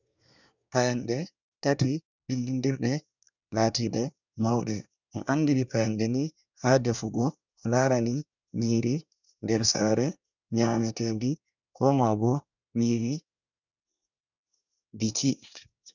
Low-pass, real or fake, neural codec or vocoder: 7.2 kHz; fake; codec, 24 kHz, 1 kbps, SNAC